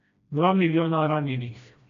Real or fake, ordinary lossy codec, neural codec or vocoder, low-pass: fake; MP3, 64 kbps; codec, 16 kHz, 1 kbps, FreqCodec, smaller model; 7.2 kHz